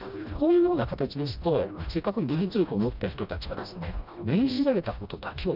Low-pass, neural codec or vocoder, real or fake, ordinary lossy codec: 5.4 kHz; codec, 16 kHz, 1 kbps, FreqCodec, smaller model; fake; none